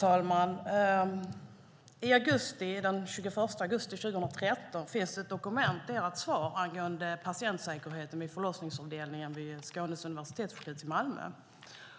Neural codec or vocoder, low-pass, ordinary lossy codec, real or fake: none; none; none; real